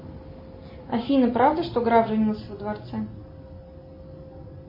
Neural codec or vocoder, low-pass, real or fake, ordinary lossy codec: none; 5.4 kHz; real; MP3, 24 kbps